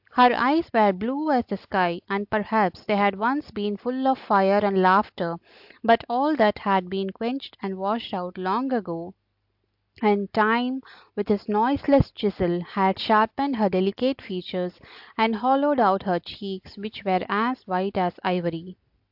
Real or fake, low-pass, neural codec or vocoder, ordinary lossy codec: real; 5.4 kHz; none; AAC, 48 kbps